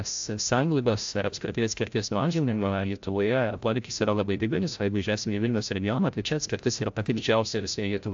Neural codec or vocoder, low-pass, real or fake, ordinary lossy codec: codec, 16 kHz, 0.5 kbps, FreqCodec, larger model; 7.2 kHz; fake; AAC, 64 kbps